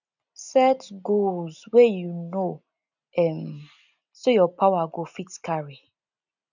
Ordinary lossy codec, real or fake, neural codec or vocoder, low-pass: none; real; none; 7.2 kHz